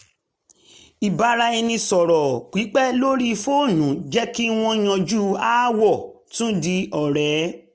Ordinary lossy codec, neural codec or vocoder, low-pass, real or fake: none; none; none; real